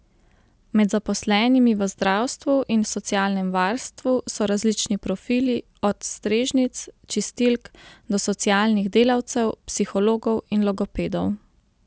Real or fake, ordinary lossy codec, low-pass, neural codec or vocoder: real; none; none; none